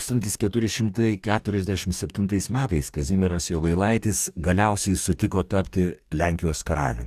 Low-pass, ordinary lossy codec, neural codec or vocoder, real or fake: 14.4 kHz; Opus, 64 kbps; codec, 44.1 kHz, 2.6 kbps, DAC; fake